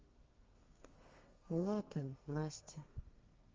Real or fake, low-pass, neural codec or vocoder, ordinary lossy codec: fake; 7.2 kHz; codec, 32 kHz, 1.9 kbps, SNAC; Opus, 32 kbps